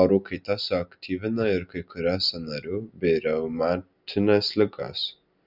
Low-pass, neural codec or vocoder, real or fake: 5.4 kHz; none; real